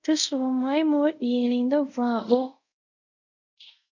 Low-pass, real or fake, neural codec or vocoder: 7.2 kHz; fake; codec, 24 kHz, 0.5 kbps, DualCodec